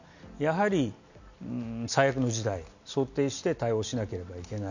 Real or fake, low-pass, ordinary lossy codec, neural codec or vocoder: real; 7.2 kHz; none; none